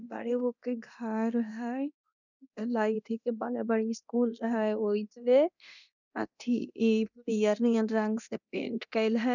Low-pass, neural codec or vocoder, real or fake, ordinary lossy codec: 7.2 kHz; codec, 24 kHz, 0.9 kbps, DualCodec; fake; none